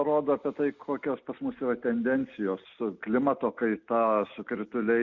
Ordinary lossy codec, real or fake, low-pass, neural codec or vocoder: Opus, 64 kbps; real; 7.2 kHz; none